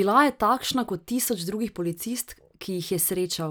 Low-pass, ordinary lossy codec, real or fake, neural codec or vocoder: none; none; real; none